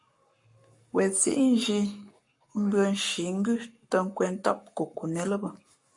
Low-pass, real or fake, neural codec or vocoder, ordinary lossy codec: 10.8 kHz; fake; codec, 44.1 kHz, 7.8 kbps, Pupu-Codec; MP3, 48 kbps